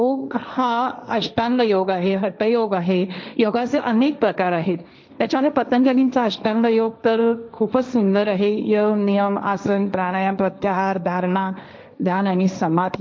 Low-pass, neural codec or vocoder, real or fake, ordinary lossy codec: none; codec, 16 kHz, 1.1 kbps, Voila-Tokenizer; fake; none